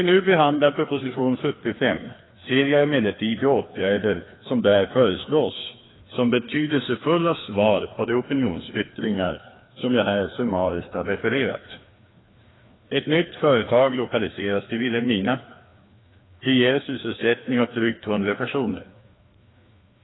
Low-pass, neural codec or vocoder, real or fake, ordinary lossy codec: 7.2 kHz; codec, 16 kHz, 2 kbps, FreqCodec, larger model; fake; AAC, 16 kbps